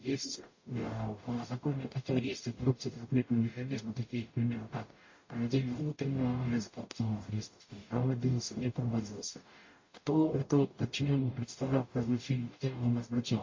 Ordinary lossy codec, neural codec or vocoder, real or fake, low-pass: MP3, 32 kbps; codec, 44.1 kHz, 0.9 kbps, DAC; fake; 7.2 kHz